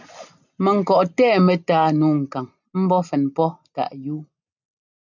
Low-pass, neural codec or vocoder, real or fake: 7.2 kHz; none; real